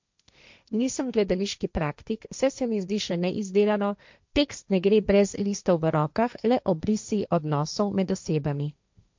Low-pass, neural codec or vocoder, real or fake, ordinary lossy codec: 7.2 kHz; codec, 16 kHz, 1.1 kbps, Voila-Tokenizer; fake; MP3, 64 kbps